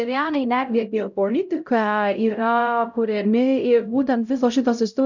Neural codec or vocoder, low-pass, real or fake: codec, 16 kHz, 0.5 kbps, X-Codec, HuBERT features, trained on LibriSpeech; 7.2 kHz; fake